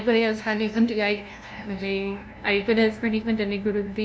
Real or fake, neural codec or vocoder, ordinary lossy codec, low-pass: fake; codec, 16 kHz, 0.5 kbps, FunCodec, trained on LibriTTS, 25 frames a second; none; none